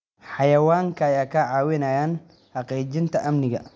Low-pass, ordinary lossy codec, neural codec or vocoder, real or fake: none; none; none; real